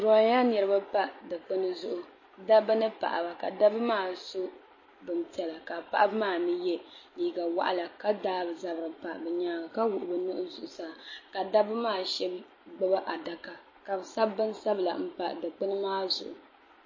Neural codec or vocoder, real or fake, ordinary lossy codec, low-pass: none; real; MP3, 32 kbps; 7.2 kHz